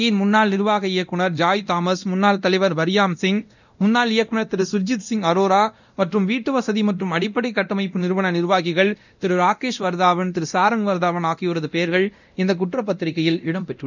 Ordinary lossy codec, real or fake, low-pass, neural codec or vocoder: none; fake; 7.2 kHz; codec, 24 kHz, 0.9 kbps, DualCodec